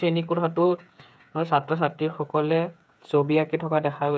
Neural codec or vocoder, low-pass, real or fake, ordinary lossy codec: codec, 16 kHz, 8 kbps, FreqCodec, smaller model; none; fake; none